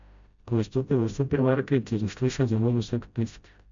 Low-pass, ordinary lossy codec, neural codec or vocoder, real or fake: 7.2 kHz; MP3, 64 kbps; codec, 16 kHz, 0.5 kbps, FreqCodec, smaller model; fake